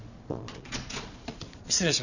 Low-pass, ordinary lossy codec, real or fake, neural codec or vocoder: 7.2 kHz; none; fake; vocoder, 22.05 kHz, 80 mel bands, Vocos